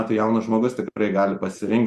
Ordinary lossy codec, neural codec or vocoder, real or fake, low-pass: Opus, 64 kbps; none; real; 14.4 kHz